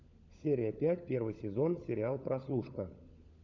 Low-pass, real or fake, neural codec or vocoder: 7.2 kHz; fake; codec, 16 kHz, 16 kbps, FunCodec, trained on LibriTTS, 50 frames a second